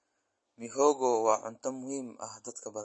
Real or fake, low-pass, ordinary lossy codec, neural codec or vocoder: real; 9.9 kHz; MP3, 32 kbps; none